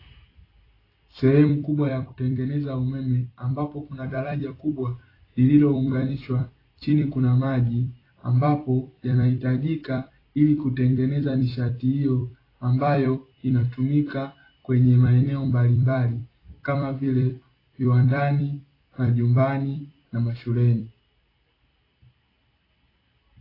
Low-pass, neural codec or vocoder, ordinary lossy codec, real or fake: 5.4 kHz; vocoder, 44.1 kHz, 128 mel bands every 256 samples, BigVGAN v2; AAC, 24 kbps; fake